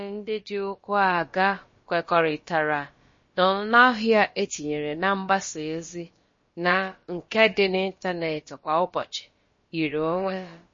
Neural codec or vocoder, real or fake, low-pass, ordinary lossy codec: codec, 16 kHz, about 1 kbps, DyCAST, with the encoder's durations; fake; 7.2 kHz; MP3, 32 kbps